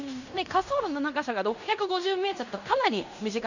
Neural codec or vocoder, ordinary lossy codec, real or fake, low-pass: codec, 16 kHz in and 24 kHz out, 0.9 kbps, LongCat-Audio-Codec, fine tuned four codebook decoder; none; fake; 7.2 kHz